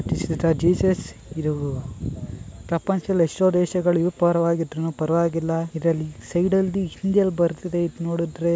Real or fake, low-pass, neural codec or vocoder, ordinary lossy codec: real; none; none; none